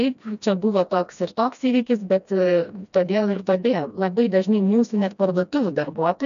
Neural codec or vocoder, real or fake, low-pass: codec, 16 kHz, 1 kbps, FreqCodec, smaller model; fake; 7.2 kHz